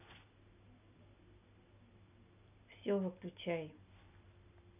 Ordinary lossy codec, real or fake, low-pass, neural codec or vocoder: none; real; 3.6 kHz; none